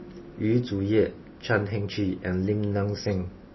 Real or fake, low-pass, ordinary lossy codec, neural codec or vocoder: real; 7.2 kHz; MP3, 24 kbps; none